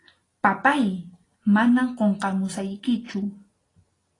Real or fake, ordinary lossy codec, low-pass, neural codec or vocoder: real; AAC, 32 kbps; 10.8 kHz; none